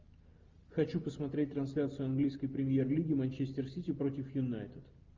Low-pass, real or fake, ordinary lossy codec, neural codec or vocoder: 7.2 kHz; real; Opus, 32 kbps; none